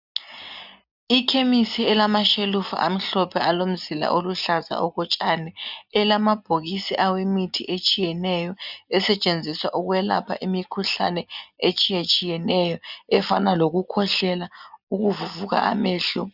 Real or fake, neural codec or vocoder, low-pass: real; none; 5.4 kHz